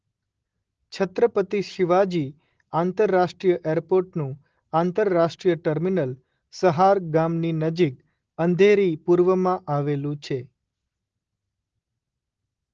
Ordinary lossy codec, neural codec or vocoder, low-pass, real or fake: Opus, 16 kbps; none; 7.2 kHz; real